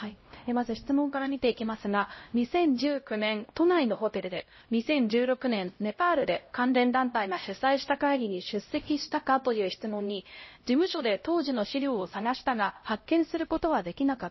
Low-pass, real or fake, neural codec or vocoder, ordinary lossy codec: 7.2 kHz; fake; codec, 16 kHz, 0.5 kbps, X-Codec, HuBERT features, trained on LibriSpeech; MP3, 24 kbps